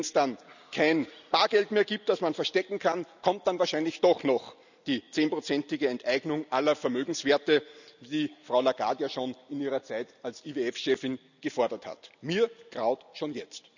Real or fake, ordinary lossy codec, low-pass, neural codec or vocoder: real; none; 7.2 kHz; none